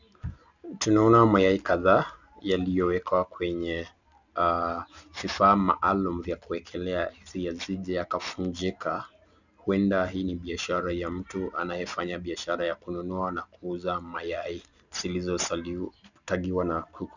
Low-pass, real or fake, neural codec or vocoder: 7.2 kHz; real; none